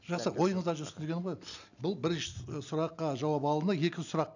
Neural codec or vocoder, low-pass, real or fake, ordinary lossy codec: none; 7.2 kHz; real; none